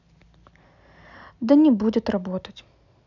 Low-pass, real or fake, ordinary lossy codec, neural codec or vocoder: 7.2 kHz; real; none; none